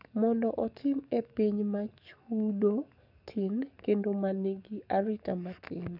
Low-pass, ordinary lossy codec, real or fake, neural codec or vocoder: 5.4 kHz; none; fake; codec, 44.1 kHz, 7.8 kbps, DAC